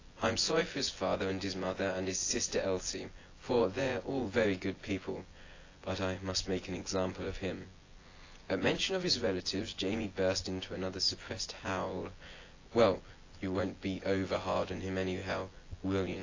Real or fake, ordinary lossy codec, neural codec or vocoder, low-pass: fake; AAC, 32 kbps; vocoder, 24 kHz, 100 mel bands, Vocos; 7.2 kHz